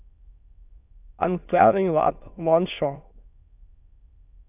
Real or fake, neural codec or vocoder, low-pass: fake; autoencoder, 22.05 kHz, a latent of 192 numbers a frame, VITS, trained on many speakers; 3.6 kHz